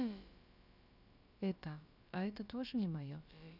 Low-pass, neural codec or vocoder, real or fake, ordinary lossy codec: 5.4 kHz; codec, 16 kHz, about 1 kbps, DyCAST, with the encoder's durations; fake; none